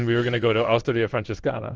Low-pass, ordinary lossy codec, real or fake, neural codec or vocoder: 7.2 kHz; Opus, 24 kbps; fake; codec, 16 kHz in and 24 kHz out, 1 kbps, XY-Tokenizer